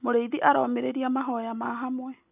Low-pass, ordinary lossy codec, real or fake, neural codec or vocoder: 3.6 kHz; none; real; none